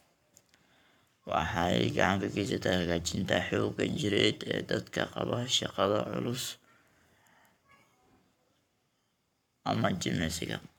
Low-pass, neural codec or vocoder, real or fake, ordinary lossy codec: 19.8 kHz; codec, 44.1 kHz, 7.8 kbps, Pupu-Codec; fake; none